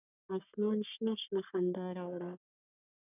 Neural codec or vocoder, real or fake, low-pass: codec, 44.1 kHz, 3.4 kbps, Pupu-Codec; fake; 3.6 kHz